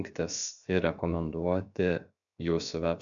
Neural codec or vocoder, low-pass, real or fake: codec, 16 kHz, 0.7 kbps, FocalCodec; 7.2 kHz; fake